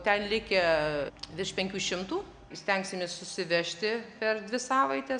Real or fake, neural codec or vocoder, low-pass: real; none; 9.9 kHz